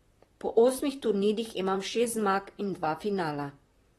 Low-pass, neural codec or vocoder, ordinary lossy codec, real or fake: 19.8 kHz; vocoder, 44.1 kHz, 128 mel bands, Pupu-Vocoder; AAC, 32 kbps; fake